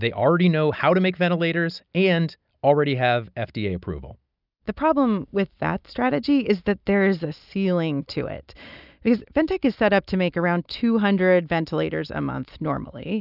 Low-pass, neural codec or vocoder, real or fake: 5.4 kHz; none; real